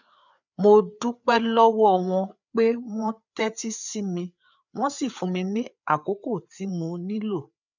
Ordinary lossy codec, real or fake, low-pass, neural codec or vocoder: none; fake; 7.2 kHz; codec, 16 kHz, 4 kbps, FreqCodec, larger model